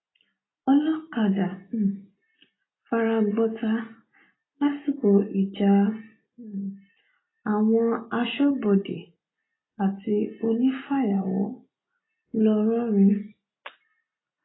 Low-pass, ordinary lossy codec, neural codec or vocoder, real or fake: 7.2 kHz; AAC, 16 kbps; none; real